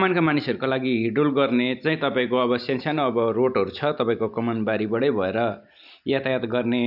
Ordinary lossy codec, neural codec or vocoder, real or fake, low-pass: none; none; real; 5.4 kHz